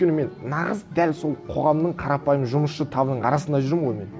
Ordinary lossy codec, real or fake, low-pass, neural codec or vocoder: none; real; none; none